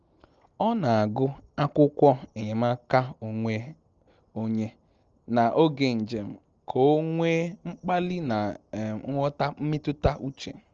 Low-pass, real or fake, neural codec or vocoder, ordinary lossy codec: 7.2 kHz; real; none; Opus, 24 kbps